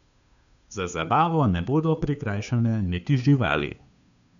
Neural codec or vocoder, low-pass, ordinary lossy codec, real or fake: codec, 16 kHz, 2 kbps, FunCodec, trained on Chinese and English, 25 frames a second; 7.2 kHz; none; fake